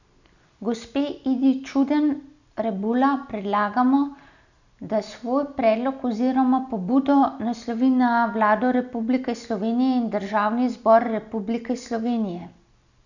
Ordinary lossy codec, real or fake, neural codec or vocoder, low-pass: none; real; none; 7.2 kHz